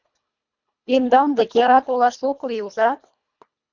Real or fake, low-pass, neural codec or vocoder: fake; 7.2 kHz; codec, 24 kHz, 1.5 kbps, HILCodec